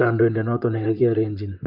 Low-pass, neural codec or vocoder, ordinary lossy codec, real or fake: 5.4 kHz; vocoder, 44.1 kHz, 128 mel bands, Pupu-Vocoder; Opus, 24 kbps; fake